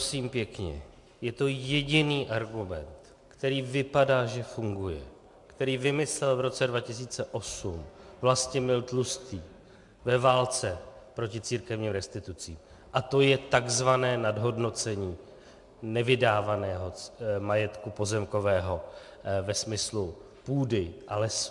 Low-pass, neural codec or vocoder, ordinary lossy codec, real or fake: 10.8 kHz; none; MP3, 64 kbps; real